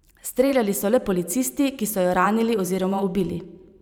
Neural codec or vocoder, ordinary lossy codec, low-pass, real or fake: vocoder, 44.1 kHz, 128 mel bands every 512 samples, BigVGAN v2; none; none; fake